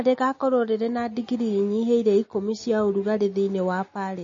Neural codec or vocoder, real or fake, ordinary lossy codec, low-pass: none; real; MP3, 32 kbps; 7.2 kHz